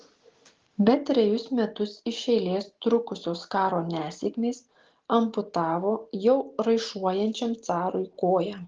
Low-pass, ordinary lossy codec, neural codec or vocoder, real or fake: 7.2 kHz; Opus, 16 kbps; none; real